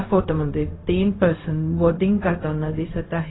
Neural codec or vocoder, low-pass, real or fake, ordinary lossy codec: codec, 16 kHz, 0.4 kbps, LongCat-Audio-Codec; 7.2 kHz; fake; AAC, 16 kbps